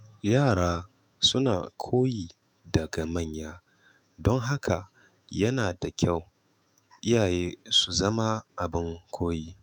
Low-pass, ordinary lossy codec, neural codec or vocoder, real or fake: 19.8 kHz; none; autoencoder, 48 kHz, 128 numbers a frame, DAC-VAE, trained on Japanese speech; fake